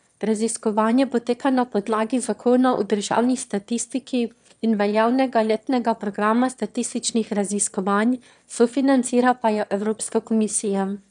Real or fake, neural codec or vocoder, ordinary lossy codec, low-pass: fake; autoencoder, 22.05 kHz, a latent of 192 numbers a frame, VITS, trained on one speaker; none; 9.9 kHz